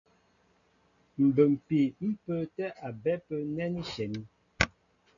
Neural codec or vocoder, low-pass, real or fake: none; 7.2 kHz; real